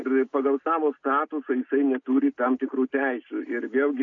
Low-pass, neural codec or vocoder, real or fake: 7.2 kHz; none; real